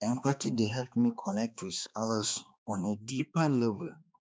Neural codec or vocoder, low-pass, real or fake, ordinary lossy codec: codec, 16 kHz, 2 kbps, X-Codec, HuBERT features, trained on balanced general audio; none; fake; none